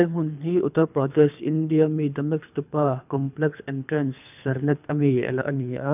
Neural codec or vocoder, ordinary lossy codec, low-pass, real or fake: codec, 24 kHz, 3 kbps, HILCodec; none; 3.6 kHz; fake